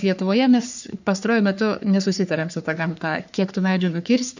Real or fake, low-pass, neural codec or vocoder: fake; 7.2 kHz; codec, 44.1 kHz, 3.4 kbps, Pupu-Codec